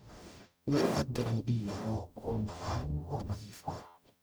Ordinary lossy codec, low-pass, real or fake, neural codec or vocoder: none; none; fake; codec, 44.1 kHz, 0.9 kbps, DAC